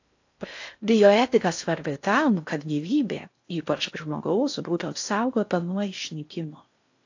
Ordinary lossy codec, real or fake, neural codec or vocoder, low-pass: AAC, 48 kbps; fake; codec, 16 kHz in and 24 kHz out, 0.6 kbps, FocalCodec, streaming, 4096 codes; 7.2 kHz